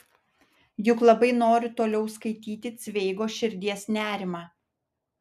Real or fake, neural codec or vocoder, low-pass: real; none; 14.4 kHz